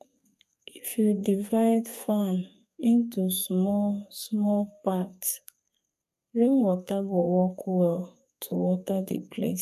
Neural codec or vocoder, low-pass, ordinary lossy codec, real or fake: codec, 32 kHz, 1.9 kbps, SNAC; 14.4 kHz; MP3, 96 kbps; fake